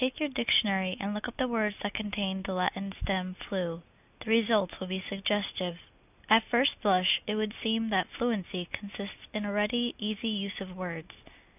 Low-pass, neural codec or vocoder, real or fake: 3.6 kHz; none; real